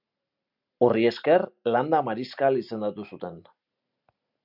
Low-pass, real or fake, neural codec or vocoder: 5.4 kHz; real; none